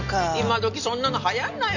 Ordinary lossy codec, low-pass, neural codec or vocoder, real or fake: none; 7.2 kHz; none; real